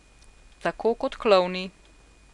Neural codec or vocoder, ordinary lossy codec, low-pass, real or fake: none; none; 10.8 kHz; real